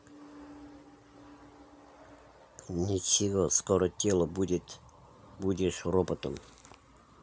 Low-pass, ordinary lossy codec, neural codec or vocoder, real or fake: none; none; none; real